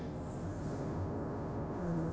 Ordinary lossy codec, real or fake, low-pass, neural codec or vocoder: none; fake; none; codec, 16 kHz, 0.5 kbps, FunCodec, trained on Chinese and English, 25 frames a second